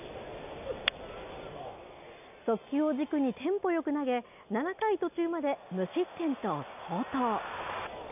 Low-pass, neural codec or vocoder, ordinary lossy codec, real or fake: 3.6 kHz; none; none; real